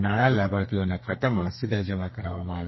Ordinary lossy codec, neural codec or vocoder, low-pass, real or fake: MP3, 24 kbps; codec, 32 kHz, 1.9 kbps, SNAC; 7.2 kHz; fake